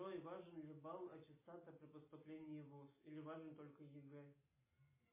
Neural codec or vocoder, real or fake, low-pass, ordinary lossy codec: none; real; 3.6 kHz; MP3, 16 kbps